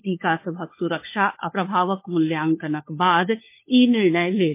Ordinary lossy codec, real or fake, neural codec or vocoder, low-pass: MP3, 24 kbps; fake; codec, 16 kHz, 4 kbps, FunCodec, trained on LibriTTS, 50 frames a second; 3.6 kHz